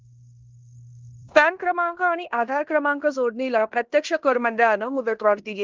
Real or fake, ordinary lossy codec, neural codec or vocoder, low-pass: fake; Opus, 32 kbps; codec, 16 kHz in and 24 kHz out, 0.9 kbps, LongCat-Audio-Codec, fine tuned four codebook decoder; 7.2 kHz